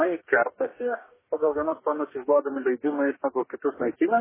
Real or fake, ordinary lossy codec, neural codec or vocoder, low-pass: fake; MP3, 16 kbps; codec, 44.1 kHz, 2.6 kbps, DAC; 3.6 kHz